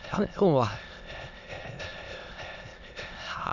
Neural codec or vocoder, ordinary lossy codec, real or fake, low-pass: autoencoder, 22.05 kHz, a latent of 192 numbers a frame, VITS, trained on many speakers; none; fake; 7.2 kHz